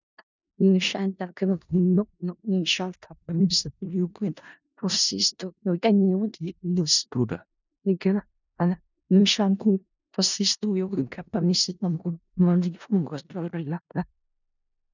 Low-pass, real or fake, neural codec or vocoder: 7.2 kHz; fake; codec, 16 kHz in and 24 kHz out, 0.4 kbps, LongCat-Audio-Codec, four codebook decoder